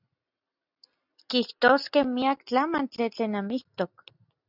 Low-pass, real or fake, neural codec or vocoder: 5.4 kHz; real; none